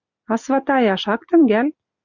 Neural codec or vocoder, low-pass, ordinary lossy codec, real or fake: none; 7.2 kHz; Opus, 64 kbps; real